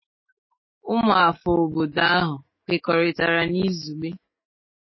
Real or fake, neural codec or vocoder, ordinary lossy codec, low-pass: fake; autoencoder, 48 kHz, 128 numbers a frame, DAC-VAE, trained on Japanese speech; MP3, 24 kbps; 7.2 kHz